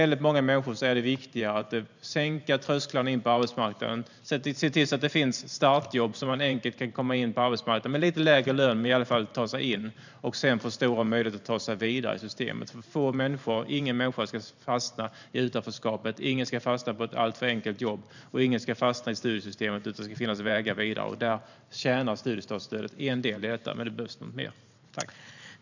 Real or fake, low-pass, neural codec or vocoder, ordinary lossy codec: fake; 7.2 kHz; vocoder, 44.1 kHz, 128 mel bands every 512 samples, BigVGAN v2; none